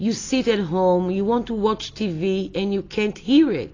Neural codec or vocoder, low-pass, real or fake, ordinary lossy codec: none; 7.2 kHz; real; AAC, 32 kbps